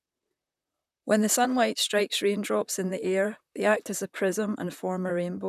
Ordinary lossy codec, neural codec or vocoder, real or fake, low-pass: none; vocoder, 44.1 kHz, 128 mel bands, Pupu-Vocoder; fake; 14.4 kHz